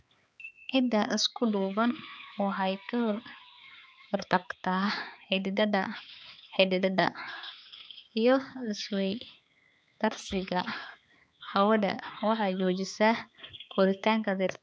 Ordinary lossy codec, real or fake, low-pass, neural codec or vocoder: none; fake; none; codec, 16 kHz, 4 kbps, X-Codec, HuBERT features, trained on balanced general audio